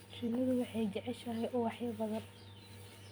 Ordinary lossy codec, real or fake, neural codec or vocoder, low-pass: none; fake; vocoder, 44.1 kHz, 128 mel bands every 256 samples, BigVGAN v2; none